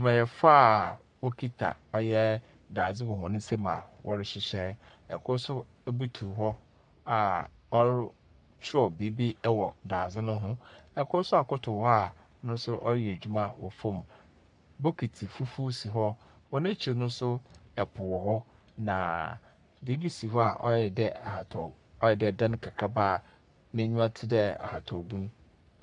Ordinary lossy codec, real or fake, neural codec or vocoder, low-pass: MP3, 96 kbps; fake; codec, 44.1 kHz, 3.4 kbps, Pupu-Codec; 10.8 kHz